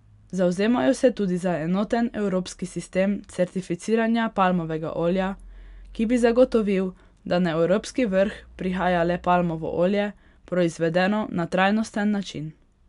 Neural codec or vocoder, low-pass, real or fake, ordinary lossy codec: none; 10.8 kHz; real; none